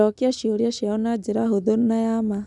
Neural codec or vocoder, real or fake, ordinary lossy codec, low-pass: none; real; none; 10.8 kHz